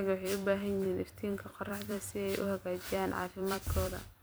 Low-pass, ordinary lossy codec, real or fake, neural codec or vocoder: none; none; fake; vocoder, 44.1 kHz, 128 mel bands every 256 samples, BigVGAN v2